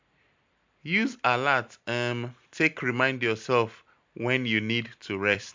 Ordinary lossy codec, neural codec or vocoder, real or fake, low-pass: MP3, 64 kbps; vocoder, 44.1 kHz, 128 mel bands every 256 samples, BigVGAN v2; fake; 7.2 kHz